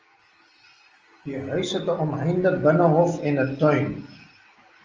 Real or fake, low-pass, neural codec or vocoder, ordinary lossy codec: real; 7.2 kHz; none; Opus, 24 kbps